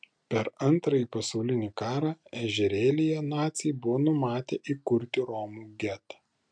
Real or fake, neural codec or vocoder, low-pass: real; none; 9.9 kHz